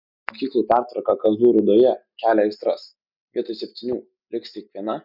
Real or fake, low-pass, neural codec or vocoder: real; 5.4 kHz; none